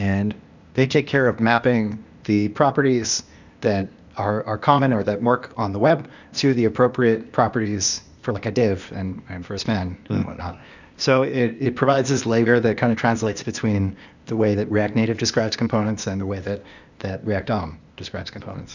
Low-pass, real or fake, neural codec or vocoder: 7.2 kHz; fake; codec, 16 kHz, 0.8 kbps, ZipCodec